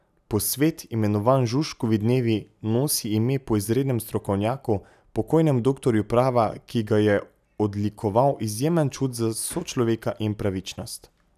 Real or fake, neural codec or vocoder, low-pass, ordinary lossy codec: real; none; 14.4 kHz; none